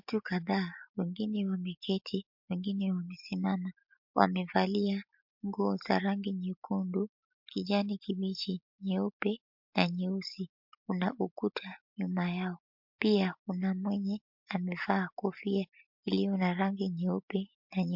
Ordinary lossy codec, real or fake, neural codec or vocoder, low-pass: MP3, 48 kbps; real; none; 5.4 kHz